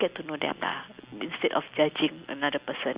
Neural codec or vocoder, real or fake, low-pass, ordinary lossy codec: none; real; 3.6 kHz; Opus, 64 kbps